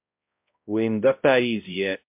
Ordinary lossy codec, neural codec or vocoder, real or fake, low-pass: MP3, 32 kbps; codec, 16 kHz, 0.5 kbps, X-Codec, HuBERT features, trained on balanced general audio; fake; 3.6 kHz